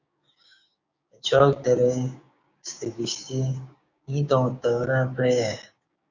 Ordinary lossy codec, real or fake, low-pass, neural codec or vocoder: Opus, 64 kbps; fake; 7.2 kHz; codec, 16 kHz, 6 kbps, DAC